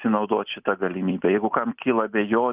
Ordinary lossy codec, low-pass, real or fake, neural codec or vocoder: Opus, 32 kbps; 3.6 kHz; real; none